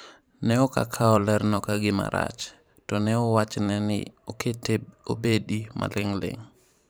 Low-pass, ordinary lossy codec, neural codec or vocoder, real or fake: none; none; vocoder, 44.1 kHz, 128 mel bands every 512 samples, BigVGAN v2; fake